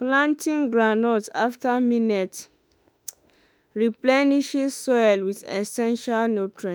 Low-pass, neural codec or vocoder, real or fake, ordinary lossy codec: none; autoencoder, 48 kHz, 32 numbers a frame, DAC-VAE, trained on Japanese speech; fake; none